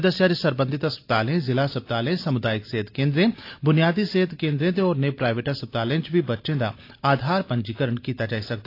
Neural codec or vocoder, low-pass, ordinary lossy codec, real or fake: none; 5.4 kHz; AAC, 32 kbps; real